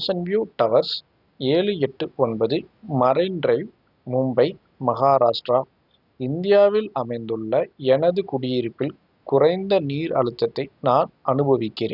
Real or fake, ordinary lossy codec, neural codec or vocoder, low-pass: real; none; none; 5.4 kHz